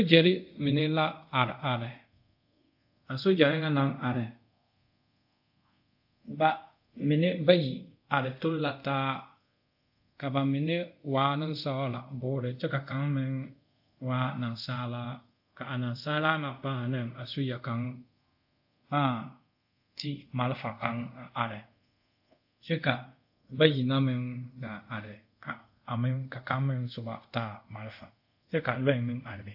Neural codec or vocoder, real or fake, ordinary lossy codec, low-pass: codec, 24 kHz, 0.5 kbps, DualCodec; fake; AAC, 48 kbps; 5.4 kHz